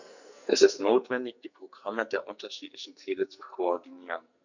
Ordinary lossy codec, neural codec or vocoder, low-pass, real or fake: none; codec, 44.1 kHz, 2.6 kbps, SNAC; 7.2 kHz; fake